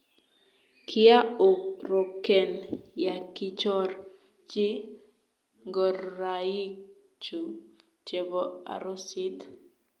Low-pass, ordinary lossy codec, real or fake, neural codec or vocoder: 19.8 kHz; Opus, 32 kbps; real; none